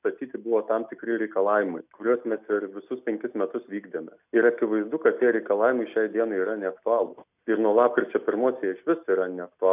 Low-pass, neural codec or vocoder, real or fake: 3.6 kHz; none; real